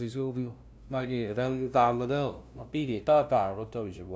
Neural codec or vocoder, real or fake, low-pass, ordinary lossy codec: codec, 16 kHz, 0.5 kbps, FunCodec, trained on LibriTTS, 25 frames a second; fake; none; none